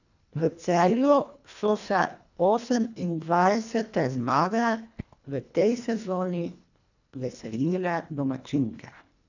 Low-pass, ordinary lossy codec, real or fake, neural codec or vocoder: 7.2 kHz; none; fake; codec, 24 kHz, 1.5 kbps, HILCodec